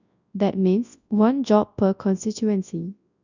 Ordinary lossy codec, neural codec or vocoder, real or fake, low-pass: none; codec, 24 kHz, 0.9 kbps, WavTokenizer, large speech release; fake; 7.2 kHz